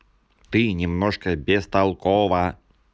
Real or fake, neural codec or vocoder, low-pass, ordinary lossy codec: real; none; none; none